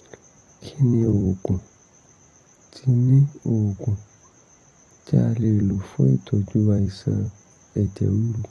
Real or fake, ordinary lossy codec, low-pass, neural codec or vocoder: fake; AAC, 32 kbps; 19.8 kHz; vocoder, 44.1 kHz, 128 mel bands every 256 samples, BigVGAN v2